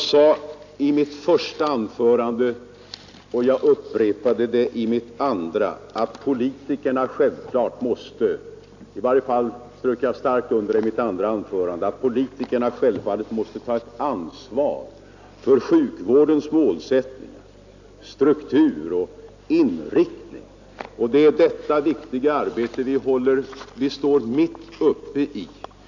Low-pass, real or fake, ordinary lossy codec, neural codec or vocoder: 7.2 kHz; real; none; none